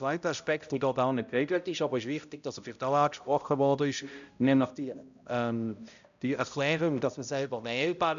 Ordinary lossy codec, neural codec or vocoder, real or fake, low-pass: AAC, 96 kbps; codec, 16 kHz, 0.5 kbps, X-Codec, HuBERT features, trained on balanced general audio; fake; 7.2 kHz